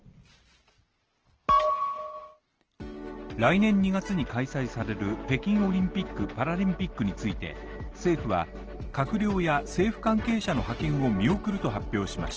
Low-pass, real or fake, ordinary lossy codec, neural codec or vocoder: 7.2 kHz; real; Opus, 16 kbps; none